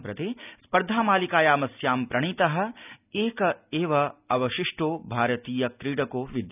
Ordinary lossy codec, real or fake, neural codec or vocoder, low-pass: none; real; none; 3.6 kHz